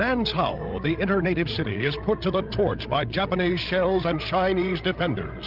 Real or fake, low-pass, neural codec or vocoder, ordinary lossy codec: fake; 5.4 kHz; codec, 16 kHz, 16 kbps, FreqCodec, larger model; Opus, 32 kbps